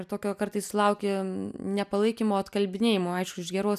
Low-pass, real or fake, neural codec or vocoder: 14.4 kHz; real; none